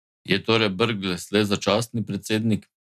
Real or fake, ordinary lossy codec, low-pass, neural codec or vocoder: real; none; 14.4 kHz; none